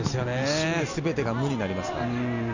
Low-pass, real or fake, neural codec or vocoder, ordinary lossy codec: 7.2 kHz; real; none; none